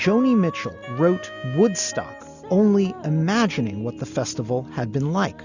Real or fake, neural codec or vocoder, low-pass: real; none; 7.2 kHz